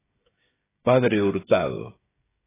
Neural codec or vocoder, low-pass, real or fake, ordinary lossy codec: codec, 16 kHz, 16 kbps, FreqCodec, smaller model; 3.6 kHz; fake; AAC, 16 kbps